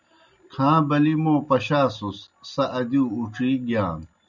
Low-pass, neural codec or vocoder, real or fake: 7.2 kHz; none; real